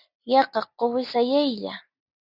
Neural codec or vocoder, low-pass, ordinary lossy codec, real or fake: none; 5.4 kHz; Opus, 64 kbps; real